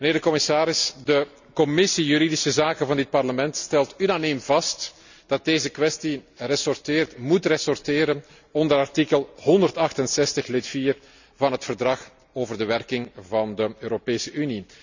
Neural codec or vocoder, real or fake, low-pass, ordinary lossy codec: none; real; 7.2 kHz; none